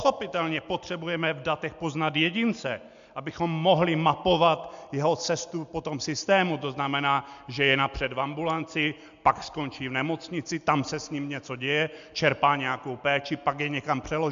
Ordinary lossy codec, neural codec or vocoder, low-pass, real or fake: MP3, 64 kbps; none; 7.2 kHz; real